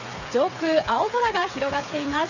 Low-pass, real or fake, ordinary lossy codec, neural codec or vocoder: 7.2 kHz; fake; none; codec, 16 kHz, 8 kbps, FreqCodec, smaller model